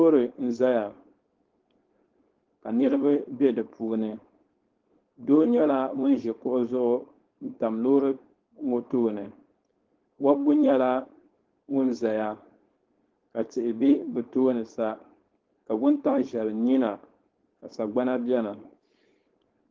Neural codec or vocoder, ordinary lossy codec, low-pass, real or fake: codec, 16 kHz, 4.8 kbps, FACodec; Opus, 16 kbps; 7.2 kHz; fake